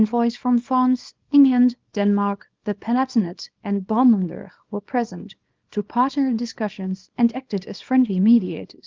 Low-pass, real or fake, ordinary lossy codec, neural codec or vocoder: 7.2 kHz; fake; Opus, 32 kbps; codec, 24 kHz, 0.9 kbps, WavTokenizer, small release